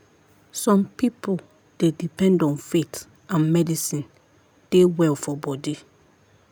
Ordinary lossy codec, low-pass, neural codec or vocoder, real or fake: none; none; none; real